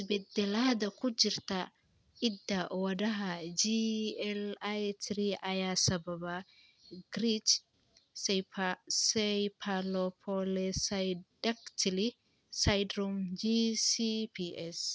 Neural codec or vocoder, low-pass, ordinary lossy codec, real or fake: none; none; none; real